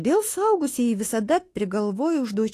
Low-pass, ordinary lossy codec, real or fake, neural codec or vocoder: 14.4 kHz; AAC, 48 kbps; fake; autoencoder, 48 kHz, 32 numbers a frame, DAC-VAE, trained on Japanese speech